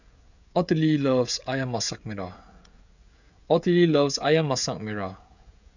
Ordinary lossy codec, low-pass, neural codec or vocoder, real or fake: none; 7.2 kHz; codec, 16 kHz, 16 kbps, FreqCodec, smaller model; fake